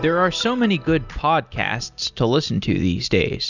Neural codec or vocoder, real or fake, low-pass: none; real; 7.2 kHz